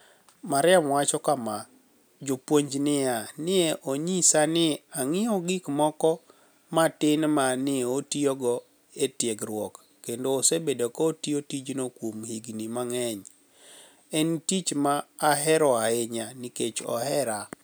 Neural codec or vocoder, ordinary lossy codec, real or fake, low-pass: none; none; real; none